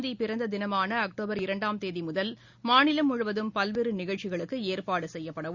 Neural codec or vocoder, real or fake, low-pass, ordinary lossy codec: none; real; 7.2 kHz; Opus, 64 kbps